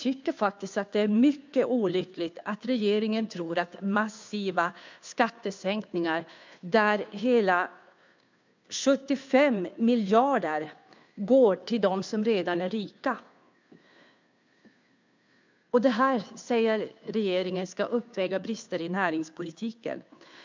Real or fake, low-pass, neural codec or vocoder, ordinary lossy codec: fake; 7.2 kHz; codec, 16 kHz, 2 kbps, FunCodec, trained on Chinese and English, 25 frames a second; MP3, 64 kbps